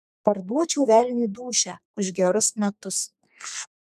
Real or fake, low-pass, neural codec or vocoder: fake; 14.4 kHz; codec, 32 kHz, 1.9 kbps, SNAC